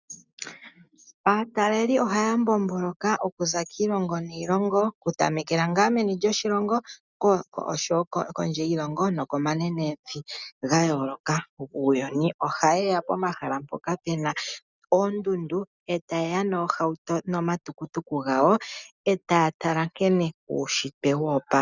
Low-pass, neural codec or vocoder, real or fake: 7.2 kHz; none; real